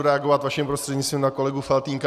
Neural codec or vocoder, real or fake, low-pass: none; real; 14.4 kHz